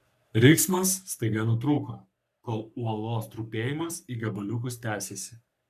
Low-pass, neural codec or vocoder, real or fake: 14.4 kHz; codec, 44.1 kHz, 3.4 kbps, Pupu-Codec; fake